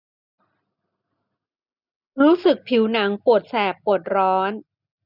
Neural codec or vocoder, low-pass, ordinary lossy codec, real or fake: none; 5.4 kHz; none; real